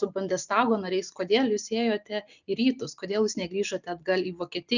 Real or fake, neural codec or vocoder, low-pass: real; none; 7.2 kHz